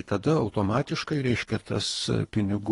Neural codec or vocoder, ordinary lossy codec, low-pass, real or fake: codec, 24 kHz, 3 kbps, HILCodec; AAC, 32 kbps; 10.8 kHz; fake